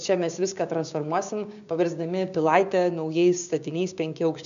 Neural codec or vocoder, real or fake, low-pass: codec, 16 kHz, 6 kbps, DAC; fake; 7.2 kHz